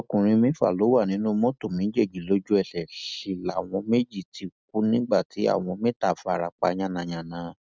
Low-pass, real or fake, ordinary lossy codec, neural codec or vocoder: none; real; none; none